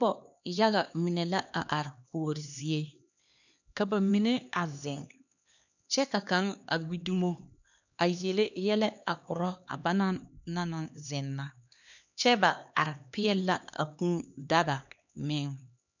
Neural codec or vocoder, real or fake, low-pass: codec, 16 kHz, 2 kbps, X-Codec, HuBERT features, trained on LibriSpeech; fake; 7.2 kHz